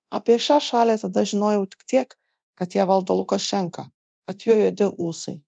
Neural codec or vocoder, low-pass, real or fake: codec, 24 kHz, 0.9 kbps, DualCodec; 9.9 kHz; fake